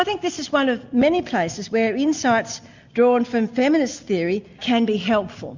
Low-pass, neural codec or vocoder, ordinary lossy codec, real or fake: 7.2 kHz; none; Opus, 64 kbps; real